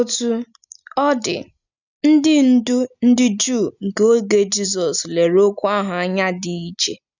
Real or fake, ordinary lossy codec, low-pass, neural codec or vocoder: real; none; 7.2 kHz; none